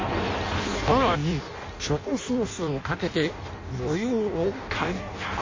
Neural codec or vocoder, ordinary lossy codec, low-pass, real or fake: codec, 16 kHz in and 24 kHz out, 0.6 kbps, FireRedTTS-2 codec; MP3, 32 kbps; 7.2 kHz; fake